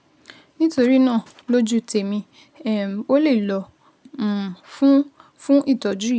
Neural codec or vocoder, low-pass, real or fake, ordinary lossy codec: none; none; real; none